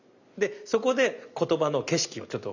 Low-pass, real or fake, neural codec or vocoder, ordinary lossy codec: 7.2 kHz; real; none; none